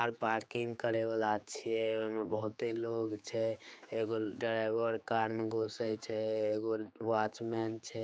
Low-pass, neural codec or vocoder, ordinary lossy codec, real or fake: none; codec, 16 kHz, 4 kbps, X-Codec, HuBERT features, trained on general audio; none; fake